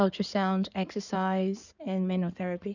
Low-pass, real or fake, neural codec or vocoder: 7.2 kHz; fake; codec, 16 kHz in and 24 kHz out, 2.2 kbps, FireRedTTS-2 codec